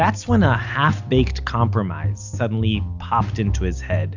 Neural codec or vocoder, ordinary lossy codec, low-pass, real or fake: none; Opus, 64 kbps; 7.2 kHz; real